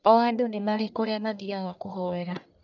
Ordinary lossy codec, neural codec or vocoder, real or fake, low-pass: none; codec, 44.1 kHz, 1.7 kbps, Pupu-Codec; fake; 7.2 kHz